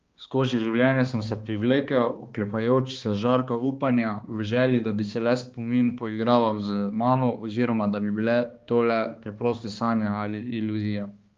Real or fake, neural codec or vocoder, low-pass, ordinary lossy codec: fake; codec, 16 kHz, 2 kbps, X-Codec, HuBERT features, trained on balanced general audio; 7.2 kHz; Opus, 32 kbps